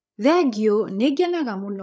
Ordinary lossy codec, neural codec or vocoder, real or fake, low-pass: none; codec, 16 kHz, 8 kbps, FreqCodec, larger model; fake; none